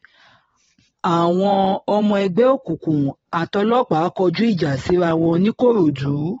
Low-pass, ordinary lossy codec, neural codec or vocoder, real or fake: 9.9 kHz; AAC, 24 kbps; vocoder, 22.05 kHz, 80 mel bands, WaveNeXt; fake